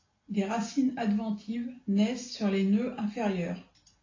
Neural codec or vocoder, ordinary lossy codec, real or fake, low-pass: none; AAC, 32 kbps; real; 7.2 kHz